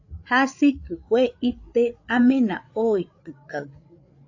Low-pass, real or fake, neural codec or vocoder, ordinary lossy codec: 7.2 kHz; fake; codec, 16 kHz, 8 kbps, FreqCodec, larger model; AAC, 48 kbps